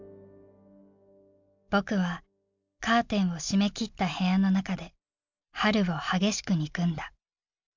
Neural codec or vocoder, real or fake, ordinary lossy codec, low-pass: none; real; MP3, 64 kbps; 7.2 kHz